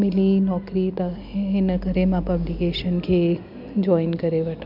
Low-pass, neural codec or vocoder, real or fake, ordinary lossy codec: 5.4 kHz; none; real; none